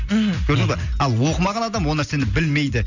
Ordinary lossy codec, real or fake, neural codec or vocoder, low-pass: none; real; none; 7.2 kHz